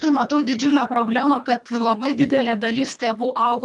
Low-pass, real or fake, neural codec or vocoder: 10.8 kHz; fake; codec, 24 kHz, 1.5 kbps, HILCodec